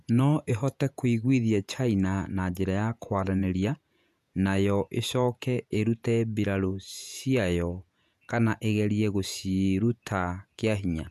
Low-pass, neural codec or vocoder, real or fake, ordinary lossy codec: 14.4 kHz; vocoder, 48 kHz, 128 mel bands, Vocos; fake; none